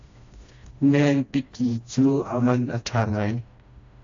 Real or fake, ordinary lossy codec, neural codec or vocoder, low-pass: fake; AAC, 48 kbps; codec, 16 kHz, 1 kbps, FreqCodec, smaller model; 7.2 kHz